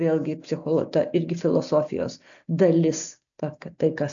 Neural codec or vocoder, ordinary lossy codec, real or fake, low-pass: none; AAC, 64 kbps; real; 7.2 kHz